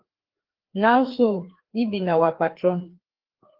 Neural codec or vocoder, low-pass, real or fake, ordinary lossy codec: codec, 16 kHz, 2 kbps, FreqCodec, larger model; 5.4 kHz; fake; Opus, 16 kbps